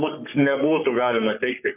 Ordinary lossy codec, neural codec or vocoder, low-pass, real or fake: AAC, 32 kbps; codec, 44.1 kHz, 3.4 kbps, Pupu-Codec; 3.6 kHz; fake